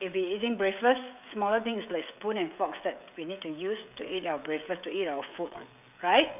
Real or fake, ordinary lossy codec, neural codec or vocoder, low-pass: fake; none; codec, 16 kHz, 16 kbps, FreqCodec, smaller model; 3.6 kHz